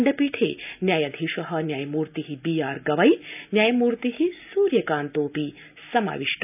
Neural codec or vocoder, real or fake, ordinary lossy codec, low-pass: none; real; none; 3.6 kHz